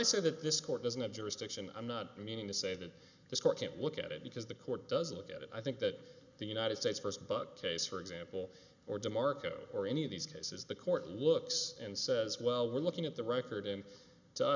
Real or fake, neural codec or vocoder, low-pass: real; none; 7.2 kHz